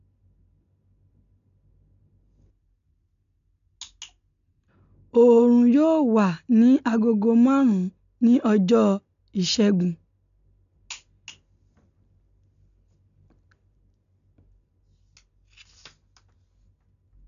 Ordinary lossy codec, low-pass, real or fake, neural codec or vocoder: none; 7.2 kHz; real; none